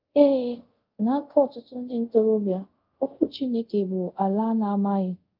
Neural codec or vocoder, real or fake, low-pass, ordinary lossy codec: codec, 24 kHz, 0.5 kbps, DualCodec; fake; 5.4 kHz; Opus, 32 kbps